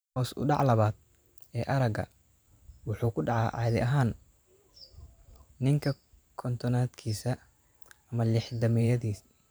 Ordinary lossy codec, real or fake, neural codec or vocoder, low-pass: none; fake; vocoder, 44.1 kHz, 128 mel bands every 512 samples, BigVGAN v2; none